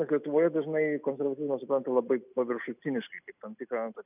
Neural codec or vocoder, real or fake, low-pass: none; real; 3.6 kHz